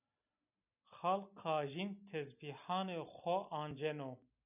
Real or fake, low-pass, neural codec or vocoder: real; 3.6 kHz; none